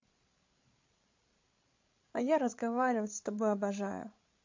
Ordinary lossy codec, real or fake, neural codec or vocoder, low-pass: MP3, 48 kbps; fake; codec, 16 kHz, 16 kbps, FreqCodec, larger model; 7.2 kHz